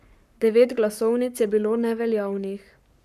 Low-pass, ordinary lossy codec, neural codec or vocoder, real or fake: 14.4 kHz; none; codec, 44.1 kHz, 7.8 kbps, Pupu-Codec; fake